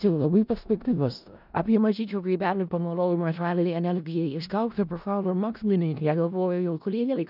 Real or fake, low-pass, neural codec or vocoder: fake; 5.4 kHz; codec, 16 kHz in and 24 kHz out, 0.4 kbps, LongCat-Audio-Codec, four codebook decoder